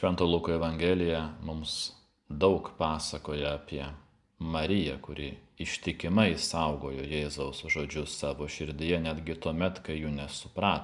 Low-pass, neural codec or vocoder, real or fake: 10.8 kHz; none; real